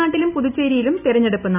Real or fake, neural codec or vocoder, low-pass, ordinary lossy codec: real; none; 3.6 kHz; none